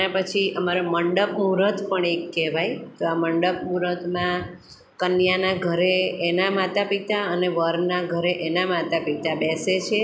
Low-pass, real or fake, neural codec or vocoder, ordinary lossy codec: none; real; none; none